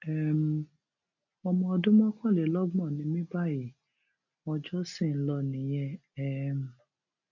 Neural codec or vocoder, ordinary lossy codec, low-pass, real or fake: none; none; 7.2 kHz; real